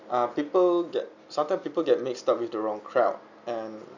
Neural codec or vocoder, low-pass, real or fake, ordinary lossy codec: none; 7.2 kHz; real; none